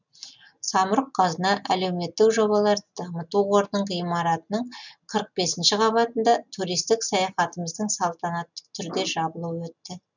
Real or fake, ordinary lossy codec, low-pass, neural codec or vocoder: real; none; 7.2 kHz; none